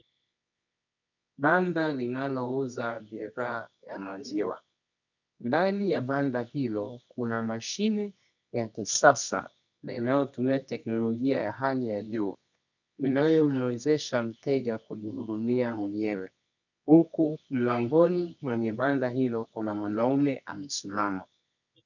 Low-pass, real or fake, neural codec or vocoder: 7.2 kHz; fake; codec, 24 kHz, 0.9 kbps, WavTokenizer, medium music audio release